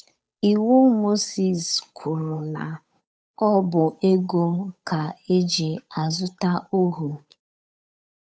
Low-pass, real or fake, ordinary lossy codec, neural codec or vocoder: none; fake; none; codec, 16 kHz, 8 kbps, FunCodec, trained on Chinese and English, 25 frames a second